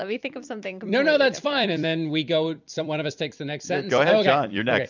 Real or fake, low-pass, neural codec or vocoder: fake; 7.2 kHz; vocoder, 44.1 kHz, 128 mel bands every 256 samples, BigVGAN v2